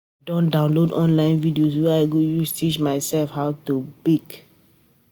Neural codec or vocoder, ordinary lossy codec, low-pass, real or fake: none; none; none; real